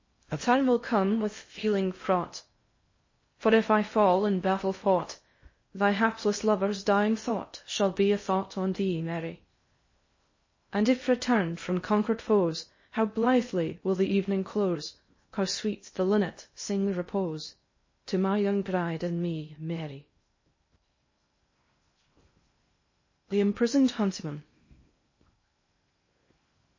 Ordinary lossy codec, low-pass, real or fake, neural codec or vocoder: MP3, 32 kbps; 7.2 kHz; fake; codec, 16 kHz in and 24 kHz out, 0.6 kbps, FocalCodec, streaming, 4096 codes